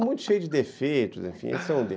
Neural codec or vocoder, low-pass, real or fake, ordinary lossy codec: none; none; real; none